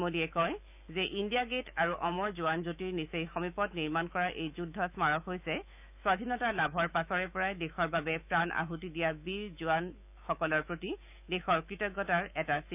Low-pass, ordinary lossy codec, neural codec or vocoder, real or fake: 3.6 kHz; none; autoencoder, 48 kHz, 128 numbers a frame, DAC-VAE, trained on Japanese speech; fake